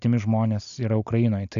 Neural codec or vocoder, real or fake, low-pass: none; real; 7.2 kHz